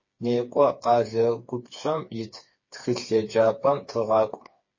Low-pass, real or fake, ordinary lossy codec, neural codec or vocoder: 7.2 kHz; fake; MP3, 32 kbps; codec, 16 kHz, 4 kbps, FreqCodec, smaller model